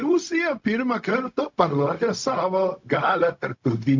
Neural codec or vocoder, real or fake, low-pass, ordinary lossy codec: codec, 16 kHz, 0.4 kbps, LongCat-Audio-Codec; fake; 7.2 kHz; MP3, 48 kbps